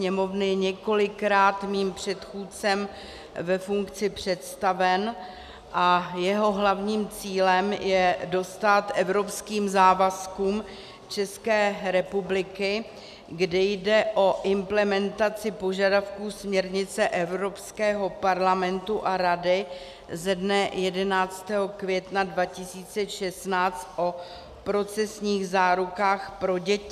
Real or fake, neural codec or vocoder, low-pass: real; none; 14.4 kHz